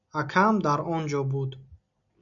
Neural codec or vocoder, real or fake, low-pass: none; real; 7.2 kHz